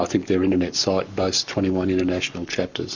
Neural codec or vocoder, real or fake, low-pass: codec, 44.1 kHz, 7.8 kbps, Pupu-Codec; fake; 7.2 kHz